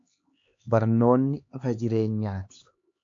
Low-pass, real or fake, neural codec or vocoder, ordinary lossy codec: 7.2 kHz; fake; codec, 16 kHz, 2 kbps, X-Codec, HuBERT features, trained on LibriSpeech; AAC, 48 kbps